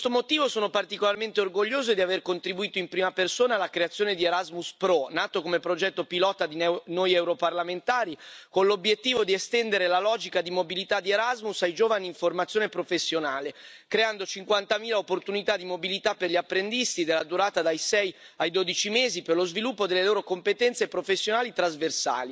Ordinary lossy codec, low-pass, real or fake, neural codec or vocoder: none; none; real; none